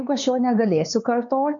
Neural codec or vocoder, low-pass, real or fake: codec, 16 kHz, 4 kbps, X-Codec, HuBERT features, trained on LibriSpeech; 7.2 kHz; fake